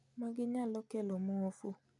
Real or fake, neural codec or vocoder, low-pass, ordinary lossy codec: real; none; 10.8 kHz; none